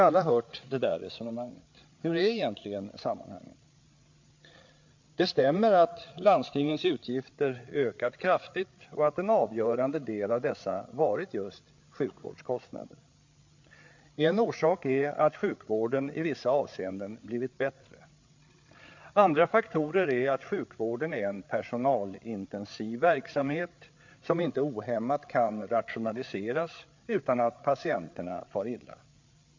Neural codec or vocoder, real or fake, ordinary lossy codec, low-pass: codec, 16 kHz, 8 kbps, FreqCodec, larger model; fake; MP3, 48 kbps; 7.2 kHz